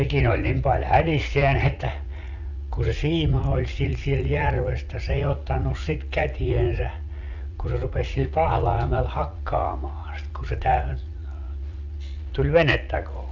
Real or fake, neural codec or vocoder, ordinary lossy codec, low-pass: fake; vocoder, 44.1 kHz, 80 mel bands, Vocos; none; 7.2 kHz